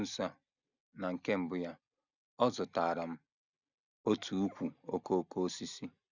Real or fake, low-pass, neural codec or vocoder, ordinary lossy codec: real; 7.2 kHz; none; none